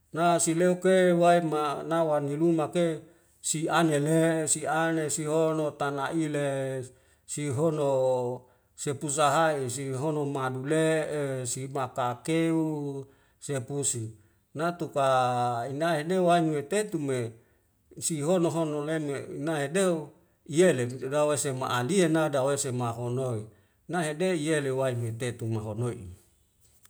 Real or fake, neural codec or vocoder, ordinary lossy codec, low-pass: real; none; none; none